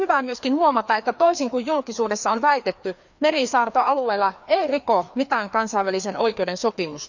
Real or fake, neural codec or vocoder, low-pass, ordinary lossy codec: fake; codec, 16 kHz, 2 kbps, FreqCodec, larger model; 7.2 kHz; none